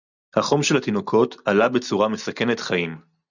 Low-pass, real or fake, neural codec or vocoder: 7.2 kHz; real; none